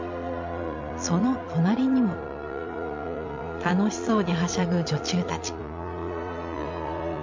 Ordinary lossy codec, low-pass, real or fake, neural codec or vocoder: MP3, 48 kbps; 7.2 kHz; fake; vocoder, 22.05 kHz, 80 mel bands, Vocos